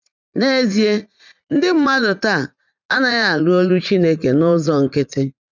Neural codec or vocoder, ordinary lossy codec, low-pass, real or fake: vocoder, 44.1 kHz, 80 mel bands, Vocos; none; 7.2 kHz; fake